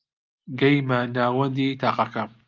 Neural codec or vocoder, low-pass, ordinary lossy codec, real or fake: none; 7.2 kHz; Opus, 24 kbps; real